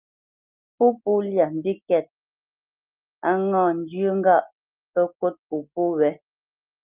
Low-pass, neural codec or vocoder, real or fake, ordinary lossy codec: 3.6 kHz; none; real; Opus, 24 kbps